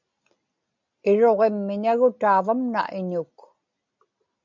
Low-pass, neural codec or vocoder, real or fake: 7.2 kHz; none; real